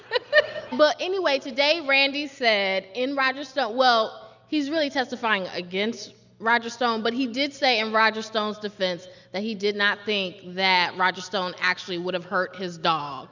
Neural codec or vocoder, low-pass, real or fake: none; 7.2 kHz; real